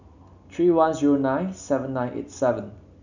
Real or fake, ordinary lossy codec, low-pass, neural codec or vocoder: real; none; 7.2 kHz; none